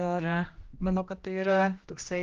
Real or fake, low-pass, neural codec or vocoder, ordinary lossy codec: fake; 7.2 kHz; codec, 16 kHz, 1 kbps, X-Codec, HuBERT features, trained on general audio; Opus, 32 kbps